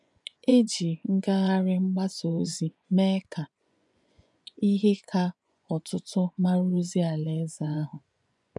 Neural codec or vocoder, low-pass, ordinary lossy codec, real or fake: vocoder, 24 kHz, 100 mel bands, Vocos; 10.8 kHz; none; fake